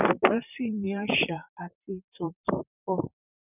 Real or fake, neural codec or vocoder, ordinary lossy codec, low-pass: fake; vocoder, 44.1 kHz, 128 mel bands, Pupu-Vocoder; none; 3.6 kHz